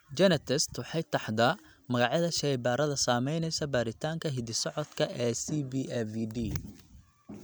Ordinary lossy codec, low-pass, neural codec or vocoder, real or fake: none; none; none; real